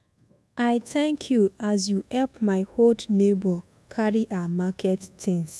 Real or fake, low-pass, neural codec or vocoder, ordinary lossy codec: fake; none; codec, 24 kHz, 1.2 kbps, DualCodec; none